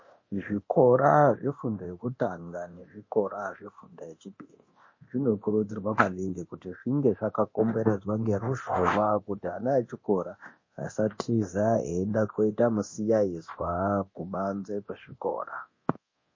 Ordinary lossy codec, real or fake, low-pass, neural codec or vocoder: MP3, 32 kbps; fake; 7.2 kHz; codec, 24 kHz, 0.9 kbps, DualCodec